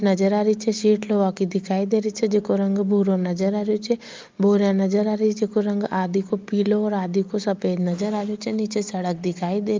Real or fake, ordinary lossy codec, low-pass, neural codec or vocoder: real; Opus, 32 kbps; 7.2 kHz; none